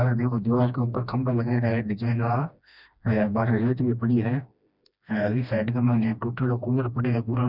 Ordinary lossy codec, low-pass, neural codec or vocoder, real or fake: none; 5.4 kHz; codec, 16 kHz, 1 kbps, FreqCodec, smaller model; fake